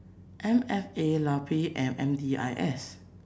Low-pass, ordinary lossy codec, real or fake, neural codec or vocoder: none; none; real; none